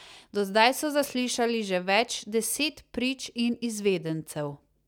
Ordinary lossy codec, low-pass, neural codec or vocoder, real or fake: none; 19.8 kHz; none; real